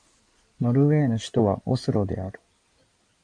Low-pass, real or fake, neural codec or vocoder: 9.9 kHz; fake; codec, 16 kHz in and 24 kHz out, 2.2 kbps, FireRedTTS-2 codec